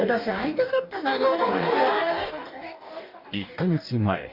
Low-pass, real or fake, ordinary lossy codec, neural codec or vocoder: 5.4 kHz; fake; none; codec, 44.1 kHz, 2.6 kbps, DAC